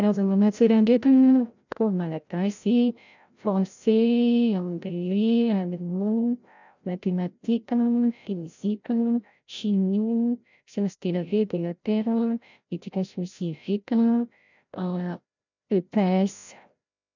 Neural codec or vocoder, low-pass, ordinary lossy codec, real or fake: codec, 16 kHz, 0.5 kbps, FreqCodec, larger model; 7.2 kHz; none; fake